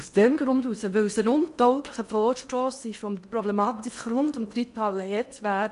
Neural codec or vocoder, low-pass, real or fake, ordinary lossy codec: codec, 16 kHz in and 24 kHz out, 0.8 kbps, FocalCodec, streaming, 65536 codes; 10.8 kHz; fake; MP3, 96 kbps